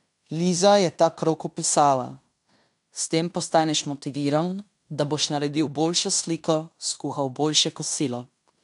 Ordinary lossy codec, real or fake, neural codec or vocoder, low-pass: none; fake; codec, 16 kHz in and 24 kHz out, 0.9 kbps, LongCat-Audio-Codec, fine tuned four codebook decoder; 10.8 kHz